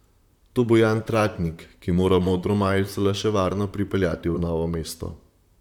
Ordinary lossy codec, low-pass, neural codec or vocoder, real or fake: none; 19.8 kHz; vocoder, 44.1 kHz, 128 mel bands, Pupu-Vocoder; fake